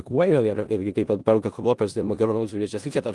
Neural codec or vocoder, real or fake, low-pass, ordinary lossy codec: codec, 16 kHz in and 24 kHz out, 0.4 kbps, LongCat-Audio-Codec, four codebook decoder; fake; 10.8 kHz; Opus, 24 kbps